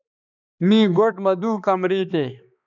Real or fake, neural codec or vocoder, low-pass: fake; codec, 16 kHz, 2 kbps, X-Codec, HuBERT features, trained on balanced general audio; 7.2 kHz